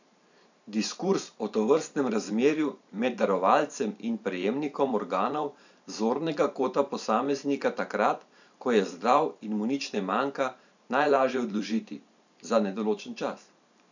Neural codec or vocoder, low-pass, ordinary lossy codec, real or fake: vocoder, 44.1 kHz, 128 mel bands every 512 samples, BigVGAN v2; 7.2 kHz; none; fake